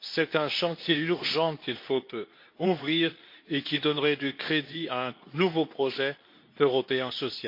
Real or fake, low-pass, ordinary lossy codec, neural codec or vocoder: fake; 5.4 kHz; MP3, 32 kbps; codec, 24 kHz, 0.9 kbps, WavTokenizer, medium speech release version 2